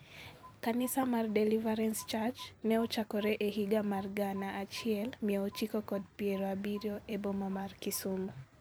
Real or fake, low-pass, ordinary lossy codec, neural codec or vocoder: fake; none; none; vocoder, 44.1 kHz, 128 mel bands every 256 samples, BigVGAN v2